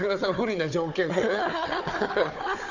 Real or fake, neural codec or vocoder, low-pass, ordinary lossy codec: fake; codec, 16 kHz, 4 kbps, FunCodec, trained on Chinese and English, 50 frames a second; 7.2 kHz; none